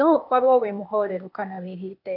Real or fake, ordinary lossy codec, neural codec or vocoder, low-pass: fake; none; codec, 16 kHz, 0.8 kbps, ZipCodec; 5.4 kHz